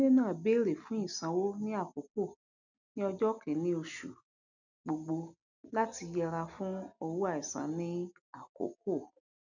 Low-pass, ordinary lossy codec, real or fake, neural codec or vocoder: 7.2 kHz; none; real; none